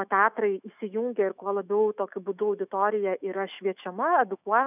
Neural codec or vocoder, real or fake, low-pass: autoencoder, 48 kHz, 128 numbers a frame, DAC-VAE, trained on Japanese speech; fake; 3.6 kHz